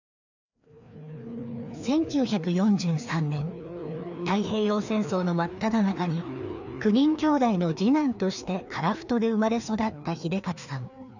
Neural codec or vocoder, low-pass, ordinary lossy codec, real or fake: codec, 16 kHz, 2 kbps, FreqCodec, larger model; 7.2 kHz; none; fake